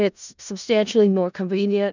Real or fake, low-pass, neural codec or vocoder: fake; 7.2 kHz; codec, 16 kHz in and 24 kHz out, 0.4 kbps, LongCat-Audio-Codec, four codebook decoder